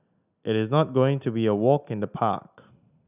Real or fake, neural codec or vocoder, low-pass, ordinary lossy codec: real; none; 3.6 kHz; none